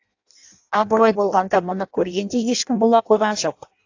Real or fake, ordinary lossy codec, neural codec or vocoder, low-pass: fake; AAC, 48 kbps; codec, 16 kHz in and 24 kHz out, 0.6 kbps, FireRedTTS-2 codec; 7.2 kHz